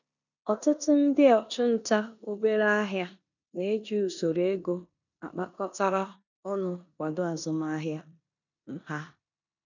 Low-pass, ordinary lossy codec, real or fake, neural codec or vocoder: 7.2 kHz; none; fake; codec, 16 kHz in and 24 kHz out, 0.9 kbps, LongCat-Audio-Codec, four codebook decoder